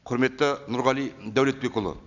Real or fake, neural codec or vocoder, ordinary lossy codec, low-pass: real; none; none; 7.2 kHz